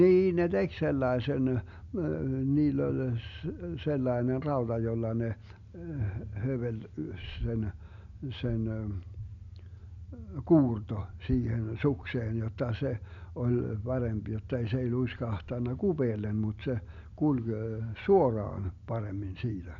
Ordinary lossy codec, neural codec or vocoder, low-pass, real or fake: none; none; 7.2 kHz; real